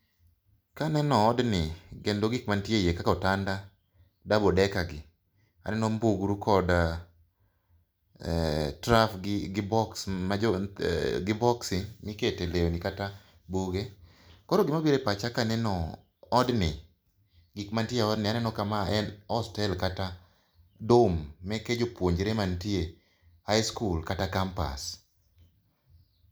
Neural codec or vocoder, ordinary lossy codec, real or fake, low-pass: none; none; real; none